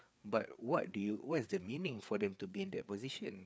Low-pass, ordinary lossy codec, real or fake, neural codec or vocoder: none; none; fake; codec, 16 kHz, 4 kbps, FreqCodec, larger model